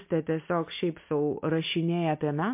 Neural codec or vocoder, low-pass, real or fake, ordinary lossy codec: none; 3.6 kHz; real; MP3, 32 kbps